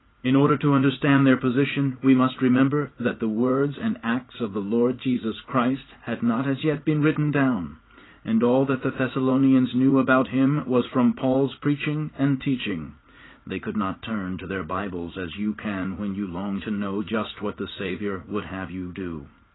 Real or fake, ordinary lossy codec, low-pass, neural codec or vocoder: fake; AAC, 16 kbps; 7.2 kHz; vocoder, 44.1 kHz, 80 mel bands, Vocos